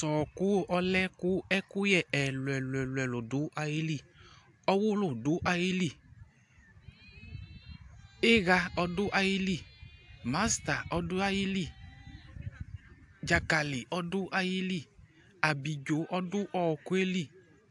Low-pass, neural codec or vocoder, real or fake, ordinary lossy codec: 10.8 kHz; none; real; AAC, 64 kbps